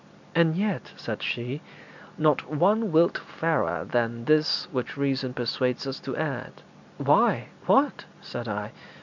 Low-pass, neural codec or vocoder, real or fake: 7.2 kHz; none; real